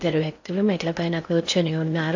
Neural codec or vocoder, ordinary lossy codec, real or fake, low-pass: codec, 16 kHz in and 24 kHz out, 0.6 kbps, FocalCodec, streaming, 4096 codes; AAC, 48 kbps; fake; 7.2 kHz